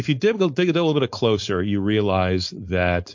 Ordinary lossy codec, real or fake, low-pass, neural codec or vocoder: MP3, 48 kbps; fake; 7.2 kHz; codec, 16 kHz, 4.8 kbps, FACodec